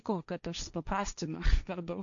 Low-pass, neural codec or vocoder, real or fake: 7.2 kHz; codec, 16 kHz, 1.1 kbps, Voila-Tokenizer; fake